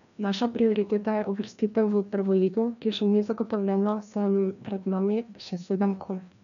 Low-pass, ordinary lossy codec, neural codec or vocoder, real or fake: 7.2 kHz; none; codec, 16 kHz, 1 kbps, FreqCodec, larger model; fake